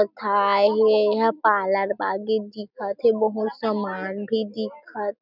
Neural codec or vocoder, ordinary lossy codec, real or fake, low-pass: none; none; real; 5.4 kHz